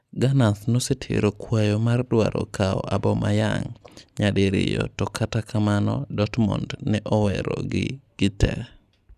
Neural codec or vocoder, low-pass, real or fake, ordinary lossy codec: none; 14.4 kHz; real; none